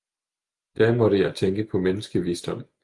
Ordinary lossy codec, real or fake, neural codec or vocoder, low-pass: Opus, 32 kbps; real; none; 10.8 kHz